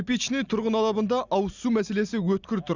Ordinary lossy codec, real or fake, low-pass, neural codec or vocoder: Opus, 64 kbps; real; 7.2 kHz; none